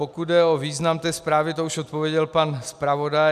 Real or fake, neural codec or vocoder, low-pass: real; none; 14.4 kHz